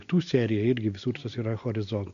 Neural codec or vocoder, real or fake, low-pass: none; real; 7.2 kHz